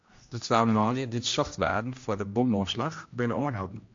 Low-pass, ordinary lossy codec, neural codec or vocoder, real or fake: 7.2 kHz; MP3, 48 kbps; codec, 16 kHz, 1 kbps, X-Codec, HuBERT features, trained on general audio; fake